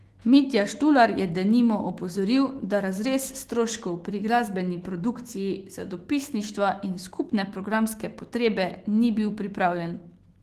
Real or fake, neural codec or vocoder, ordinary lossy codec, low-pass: fake; autoencoder, 48 kHz, 128 numbers a frame, DAC-VAE, trained on Japanese speech; Opus, 16 kbps; 14.4 kHz